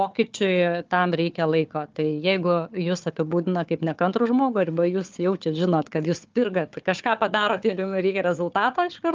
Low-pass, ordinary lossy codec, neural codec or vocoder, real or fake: 7.2 kHz; Opus, 24 kbps; codec, 16 kHz, 4 kbps, FunCodec, trained on Chinese and English, 50 frames a second; fake